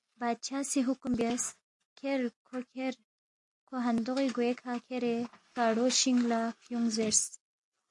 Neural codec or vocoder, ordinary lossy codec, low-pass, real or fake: none; AAC, 64 kbps; 10.8 kHz; real